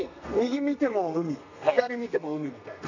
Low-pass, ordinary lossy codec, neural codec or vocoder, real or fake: 7.2 kHz; none; codec, 32 kHz, 1.9 kbps, SNAC; fake